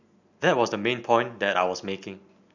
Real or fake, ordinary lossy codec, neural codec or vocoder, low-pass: real; none; none; 7.2 kHz